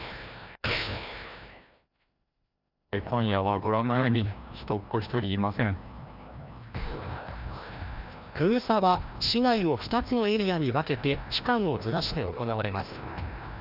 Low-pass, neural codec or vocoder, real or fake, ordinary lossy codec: 5.4 kHz; codec, 16 kHz, 1 kbps, FreqCodec, larger model; fake; none